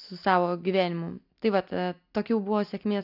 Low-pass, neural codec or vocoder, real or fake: 5.4 kHz; none; real